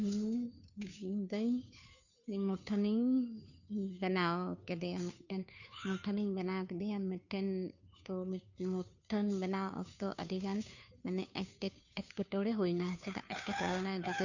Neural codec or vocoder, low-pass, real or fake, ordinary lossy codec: codec, 16 kHz, 2 kbps, FunCodec, trained on Chinese and English, 25 frames a second; 7.2 kHz; fake; none